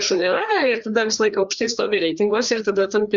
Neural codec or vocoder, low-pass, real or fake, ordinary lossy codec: codec, 16 kHz, 2 kbps, FreqCodec, larger model; 7.2 kHz; fake; Opus, 64 kbps